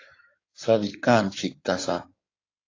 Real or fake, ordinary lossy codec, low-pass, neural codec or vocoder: fake; AAC, 32 kbps; 7.2 kHz; codec, 16 kHz in and 24 kHz out, 2.2 kbps, FireRedTTS-2 codec